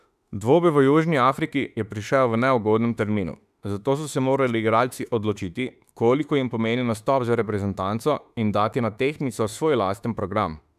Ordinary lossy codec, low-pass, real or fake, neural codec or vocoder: none; 14.4 kHz; fake; autoencoder, 48 kHz, 32 numbers a frame, DAC-VAE, trained on Japanese speech